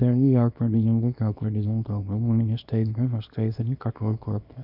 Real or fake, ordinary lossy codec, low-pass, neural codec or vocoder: fake; none; 5.4 kHz; codec, 24 kHz, 0.9 kbps, WavTokenizer, small release